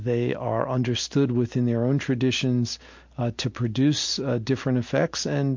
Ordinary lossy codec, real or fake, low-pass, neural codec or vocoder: MP3, 48 kbps; real; 7.2 kHz; none